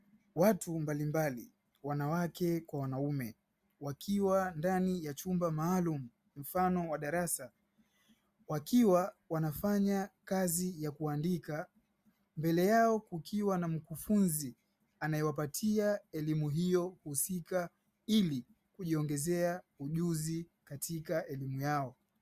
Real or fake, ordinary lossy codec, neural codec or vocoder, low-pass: real; Opus, 64 kbps; none; 14.4 kHz